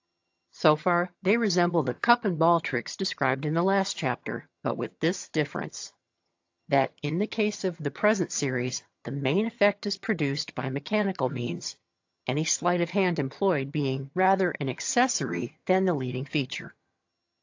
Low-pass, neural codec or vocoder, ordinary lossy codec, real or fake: 7.2 kHz; vocoder, 22.05 kHz, 80 mel bands, HiFi-GAN; AAC, 48 kbps; fake